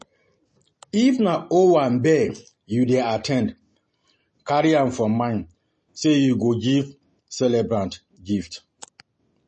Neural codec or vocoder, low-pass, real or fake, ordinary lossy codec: none; 10.8 kHz; real; MP3, 32 kbps